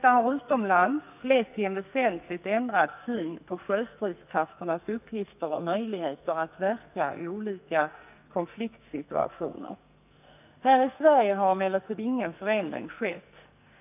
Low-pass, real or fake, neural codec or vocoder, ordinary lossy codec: 3.6 kHz; fake; codec, 44.1 kHz, 2.6 kbps, SNAC; none